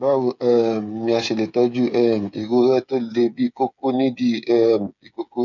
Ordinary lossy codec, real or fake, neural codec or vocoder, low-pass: none; fake; codec, 16 kHz, 8 kbps, FreqCodec, smaller model; 7.2 kHz